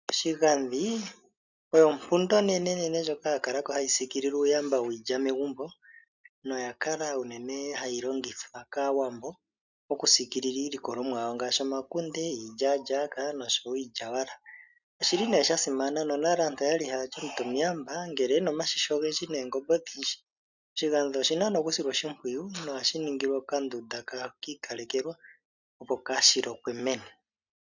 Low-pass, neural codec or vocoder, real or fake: 7.2 kHz; none; real